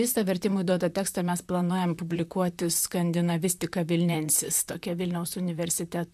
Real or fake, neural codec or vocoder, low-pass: fake; vocoder, 44.1 kHz, 128 mel bands, Pupu-Vocoder; 14.4 kHz